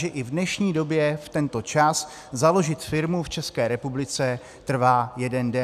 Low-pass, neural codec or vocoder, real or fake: 14.4 kHz; none; real